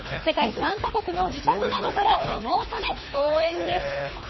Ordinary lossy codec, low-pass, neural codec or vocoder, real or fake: MP3, 24 kbps; 7.2 kHz; codec, 24 kHz, 3 kbps, HILCodec; fake